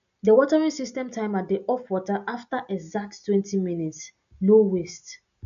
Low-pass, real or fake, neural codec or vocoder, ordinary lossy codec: 7.2 kHz; real; none; none